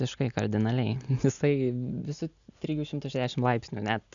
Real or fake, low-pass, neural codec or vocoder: real; 7.2 kHz; none